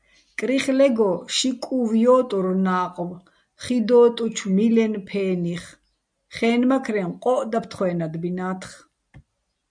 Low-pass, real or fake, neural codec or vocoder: 9.9 kHz; real; none